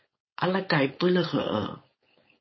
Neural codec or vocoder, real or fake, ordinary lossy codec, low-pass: codec, 16 kHz, 4.8 kbps, FACodec; fake; MP3, 24 kbps; 7.2 kHz